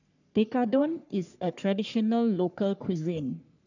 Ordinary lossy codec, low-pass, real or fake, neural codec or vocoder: none; 7.2 kHz; fake; codec, 44.1 kHz, 3.4 kbps, Pupu-Codec